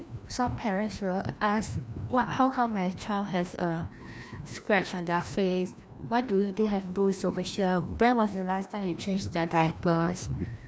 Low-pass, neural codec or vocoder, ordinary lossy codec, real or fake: none; codec, 16 kHz, 1 kbps, FreqCodec, larger model; none; fake